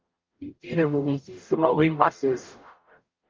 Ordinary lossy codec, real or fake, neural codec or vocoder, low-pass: Opus, 32 kbps; fake; codec, 44.1 kHz, 0.9 kbps, DAC; 7.2 kHz